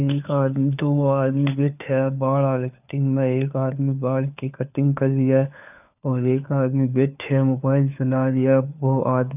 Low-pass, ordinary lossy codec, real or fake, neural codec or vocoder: 3.6 kHz; none; fake; codec, 16 kHz, 4 kbps, FunCodec, trained on LibriTTS, 50 frames a second